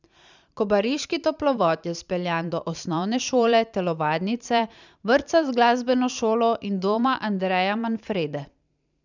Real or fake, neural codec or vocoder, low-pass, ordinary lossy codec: fake; vocoder, 44.1 kHz, 128 mel bands every 512 samples, BigVGAN v2; 7.2 kHz; none